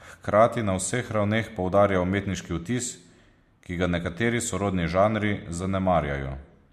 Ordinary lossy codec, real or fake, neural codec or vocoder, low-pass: MP3, 64 kbps; real; none; 14.4 kHz